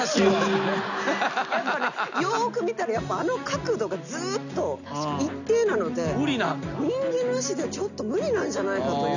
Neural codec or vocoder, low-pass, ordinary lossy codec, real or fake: none; 7.2 kHz; none; real